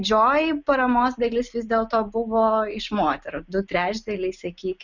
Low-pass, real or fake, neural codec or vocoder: 7.2 kHz; real; none